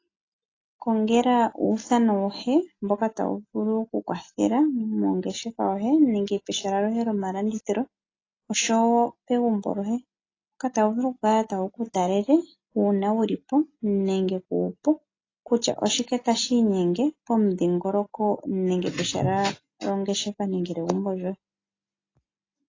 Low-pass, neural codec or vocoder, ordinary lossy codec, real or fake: 7.2 kHz; none; AAC, 32 kbps; real